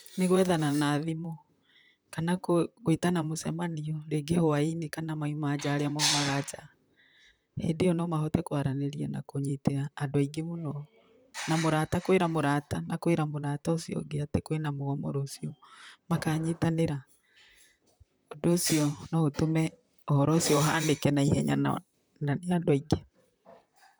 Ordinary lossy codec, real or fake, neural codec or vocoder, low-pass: none; fake; vocoder, 44.1 kHz, 128 mel bands, Pupu-Vocoder; none